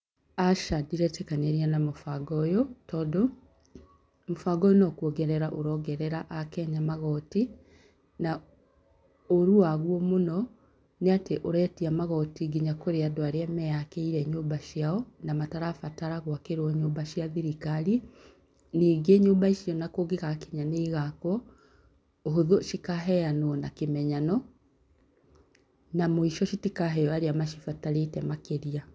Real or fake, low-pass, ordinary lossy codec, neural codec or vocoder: real; none; none; none